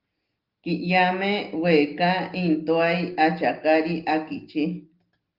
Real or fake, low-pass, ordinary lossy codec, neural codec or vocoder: real; 5.4 kHz; Opus, 32 kbps; none